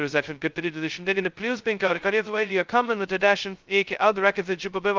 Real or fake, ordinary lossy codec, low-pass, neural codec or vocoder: fake; Opus, 32 kbps; 7.2 kHz; codec, 16 kHz, 0.2 kbps, FocalCodec